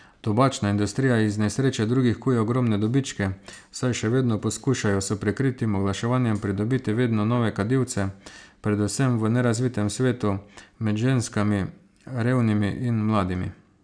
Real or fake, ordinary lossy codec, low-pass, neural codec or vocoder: real; none; 9.9 kHz; none